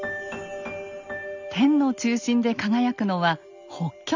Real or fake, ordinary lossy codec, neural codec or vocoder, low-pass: real; none; none; 7.2 kHz